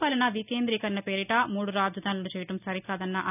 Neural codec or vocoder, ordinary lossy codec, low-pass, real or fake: none; none; 3.6 kHz; real